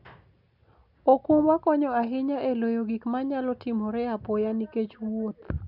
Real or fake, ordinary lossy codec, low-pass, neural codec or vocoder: real; none; 5.4 kHz; none